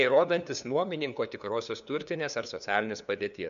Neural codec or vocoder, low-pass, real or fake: codec, 16 kHz, 2 kbps, FunCodec, trained on LibriTTS, 25 frames a second; 7.2 kHz; fake